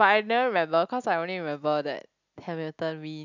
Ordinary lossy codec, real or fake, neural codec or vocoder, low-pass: none; real; none; 7.2 kHz